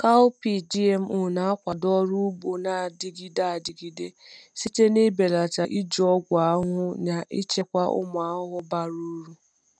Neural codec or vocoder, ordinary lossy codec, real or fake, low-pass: none; none; real; 9.9 kHz